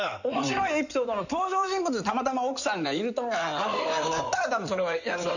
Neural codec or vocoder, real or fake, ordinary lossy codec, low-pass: codec, 16 kHz in and 24 kHz out, 2.2 kbps, FireRedTTS-2 codec; fake; none; 7.2 kHz